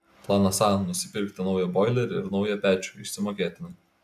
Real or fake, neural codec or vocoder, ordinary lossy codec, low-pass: real; none; AAC, 96 kbps; 14.4 kHz